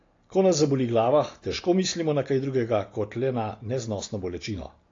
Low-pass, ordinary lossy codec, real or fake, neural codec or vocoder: 7.2 kHz; AAC, 32 kbps; real; none